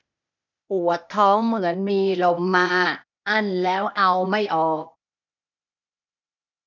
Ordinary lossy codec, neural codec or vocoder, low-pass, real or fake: none; codec, 16 kHz, 0.8 kbps, ZipCodec; 7.2 kHz; fake